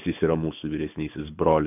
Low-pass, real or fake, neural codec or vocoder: 3.6 kHz; fake; codec, 24 kHz, 6 kbps, HILCodec